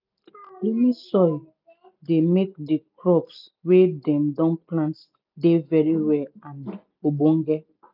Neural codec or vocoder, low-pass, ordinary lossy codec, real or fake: none; 5.4 kHz; none; real